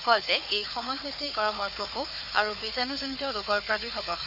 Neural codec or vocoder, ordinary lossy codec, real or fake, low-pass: codec, 16 kHz, 4 kbps, FunCodec, trained on Chinese and English, 50 frames a second; none; fake; 5.4 kHz